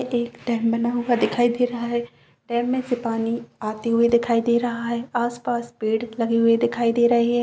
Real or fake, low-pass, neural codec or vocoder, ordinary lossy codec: real; none; none; none